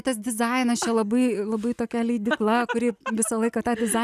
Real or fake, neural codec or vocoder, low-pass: real; none; 14.4 kHz